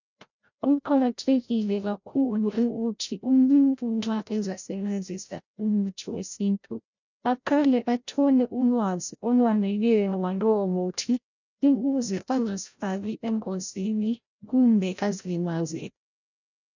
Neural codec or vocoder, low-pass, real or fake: codec, 16 kHz, 0.5 kbps, FreqCodec, larger model; 7.2 kHz; fake